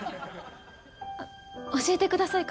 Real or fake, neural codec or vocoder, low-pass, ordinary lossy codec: real; none; none; none